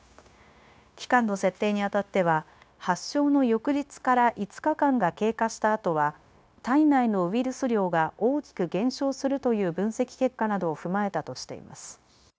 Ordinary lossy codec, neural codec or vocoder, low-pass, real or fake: none; codec, 16 kHz, 0.9 kbps, LongCat-Audio-Codec; none; fake